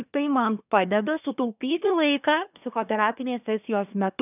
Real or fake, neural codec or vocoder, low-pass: fake; codec, 24 kHz, 1 kbps, SNAC; 3.6 kHz